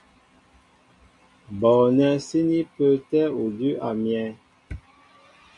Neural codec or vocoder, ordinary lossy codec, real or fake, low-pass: none; AAC, 64 kbps; real; 10.8 kHz